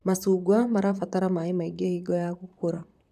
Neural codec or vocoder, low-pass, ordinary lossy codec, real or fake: vocoder, 44.1 kHz, 128 mel bands, Pupu-Vocoder; 14.4 kHz; none; fake